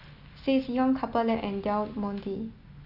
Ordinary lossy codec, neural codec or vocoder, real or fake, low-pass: none; none; real; 5.4 kHz